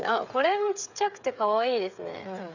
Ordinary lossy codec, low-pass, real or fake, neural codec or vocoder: none; 7.2 kHz; fake; codec, 16 kHz, 8 kbps, FreqCodec, smaller model